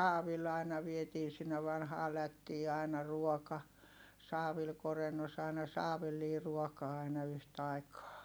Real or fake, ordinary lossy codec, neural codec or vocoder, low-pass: real; none; none; none